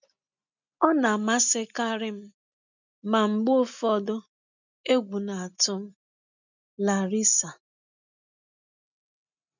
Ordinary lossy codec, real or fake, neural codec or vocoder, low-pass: none; real; none; 7.2 kHz